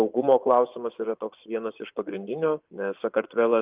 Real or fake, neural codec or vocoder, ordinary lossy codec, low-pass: fake; vocoder, 24 kHz, 100 mel bands, Vocos; Opus, 24 kbps; 3.6 kHz